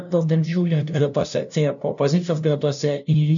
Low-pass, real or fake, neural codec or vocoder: 7.2 kHz; fake; codec, 16 kHz, 0.5 kbps, FunCodec, trained on LibriTTS, 25 frames a second